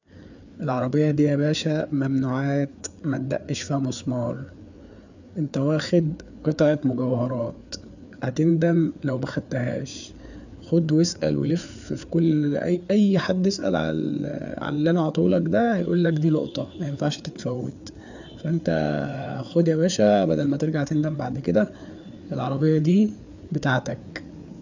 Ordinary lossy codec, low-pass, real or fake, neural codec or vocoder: none; 7.2 kHz; fake; codec, 16 kHz, 4 kbps, FreqCodec, larger model